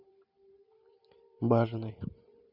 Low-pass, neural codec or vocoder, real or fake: 5.4 kHz; vocoder, 44.1 kHz, 128 mel bands every 512 samples, BigVGAN v2; fake